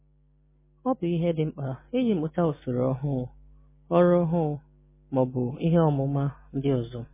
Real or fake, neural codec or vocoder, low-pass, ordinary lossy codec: real; none; 3.6 kHz; MP3, 16 kbps